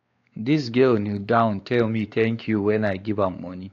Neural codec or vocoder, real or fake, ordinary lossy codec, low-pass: codec, 16 kHz, 4 kbps, X-Codec, WavLM features, trained on Multilingual LibriSpeech; fake; AAC, 48 kbps; 7.2 kHz